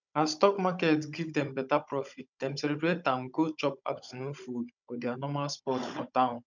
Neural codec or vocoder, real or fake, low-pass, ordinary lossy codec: codec, 16 kHz, 16 kbps, FunCodec, trained on Chinese and English, 50 frames a second; fake; 7.2 kHz; none